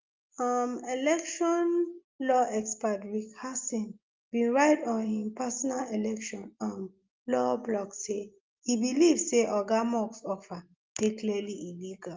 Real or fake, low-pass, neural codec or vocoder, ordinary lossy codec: real; 7.2 kHz; none; Opus, 32 kbps